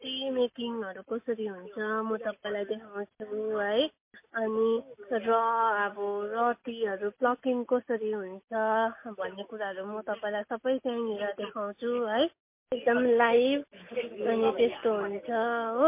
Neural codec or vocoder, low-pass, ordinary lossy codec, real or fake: none; 3.6 kHz; MP3, 24 kbps; real